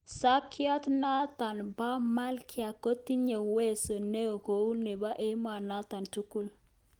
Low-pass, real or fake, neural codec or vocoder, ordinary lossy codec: 19.8 kHz; real; none; Opus, 24 kbps